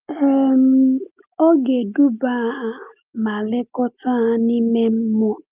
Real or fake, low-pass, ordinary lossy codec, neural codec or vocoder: real; 3.6 kHz; Opus, 24 kbps; none